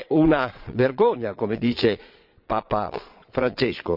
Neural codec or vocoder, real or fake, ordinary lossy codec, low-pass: vocoder, 22.05 kHz, 80 mel bands, Vocos; fake; none; 5.4 kHz